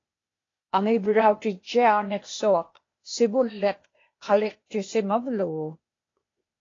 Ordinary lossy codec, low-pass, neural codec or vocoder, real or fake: AAC, 32 kbps; 7.2 kHz; codec, 16 kHz, 0.8 kbps, ZipCodec; fake